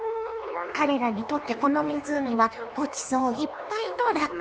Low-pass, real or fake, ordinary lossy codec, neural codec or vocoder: none; fake; none; codec, 16 kHz, 2 kbps, X-Codec, HuBERT features, trained on LibriSpeech